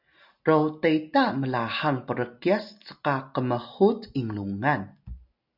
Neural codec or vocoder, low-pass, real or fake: none; 5.4 kHz; real